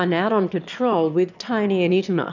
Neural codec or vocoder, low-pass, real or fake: autoencoder, 22.05 kHz, a latent of 192 numbers a frame, VITS, trained on one speaker; 7.2 kHz; fake